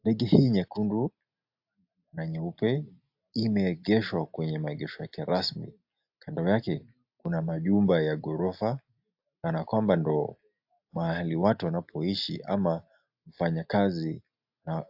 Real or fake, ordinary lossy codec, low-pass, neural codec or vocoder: real; AAC, 48 kbps; 5.4 kHz; none